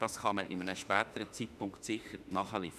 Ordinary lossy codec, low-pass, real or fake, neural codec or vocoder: none; 14.4 kHz; fake; autoencoder, 48 kHz, 32 numbers a frame, DAC-VAE, trained on Japanese speech